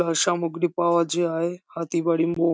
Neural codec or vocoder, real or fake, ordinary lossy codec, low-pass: none; real; none; none